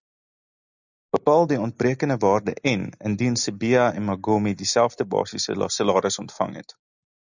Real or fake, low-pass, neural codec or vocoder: real; 7.2 kHz; none